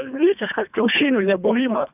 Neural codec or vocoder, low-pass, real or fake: codec, 24 kHz, 1.5 kbps, HILCodec; 3.6 kHz; fake